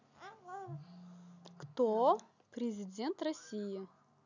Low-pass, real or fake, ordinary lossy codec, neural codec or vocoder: 7.2 kHz; real; none; none